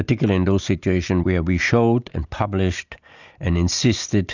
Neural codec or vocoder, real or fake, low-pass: none; real; 7.2 kHz